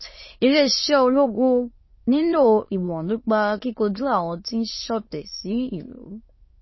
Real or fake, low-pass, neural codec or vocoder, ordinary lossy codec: fake; 7.2 kHz; autoencoder, 22.05 kHz, a latent of 192 numbers a frame, VITS, trained on many speakers; MP3, 24 kbps